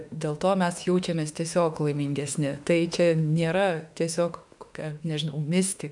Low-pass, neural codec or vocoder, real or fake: 10.8 kHz; autoencoder, 48 kHz, 32 numbers a frame, DAC-VAE, trained on Japanese speech; fake